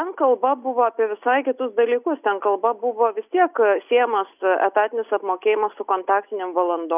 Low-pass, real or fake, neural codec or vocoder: 3.6 kHz; real; none